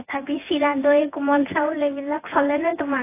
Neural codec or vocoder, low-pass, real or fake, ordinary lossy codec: vocoder, 24 kHz, 100 mel bands, Vocos; 3.6 kHz; fake; AAC, 24 kbps